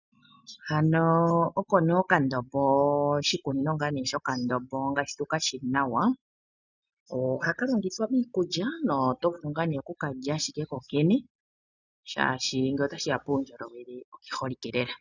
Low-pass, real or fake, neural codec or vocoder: 7.2 kHz; real; none